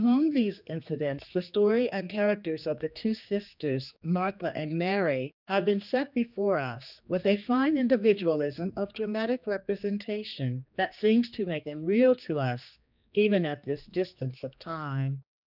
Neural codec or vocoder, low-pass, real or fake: codec, 16 kHz, 2 kbps, X-Codec, HuBERT features, trained on general audio; 5.4 kHz; fake